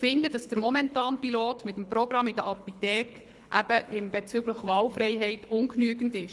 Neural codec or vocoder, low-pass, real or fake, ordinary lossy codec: codec, 24 kHz, 3 kbps, HILCodec; none; fake; none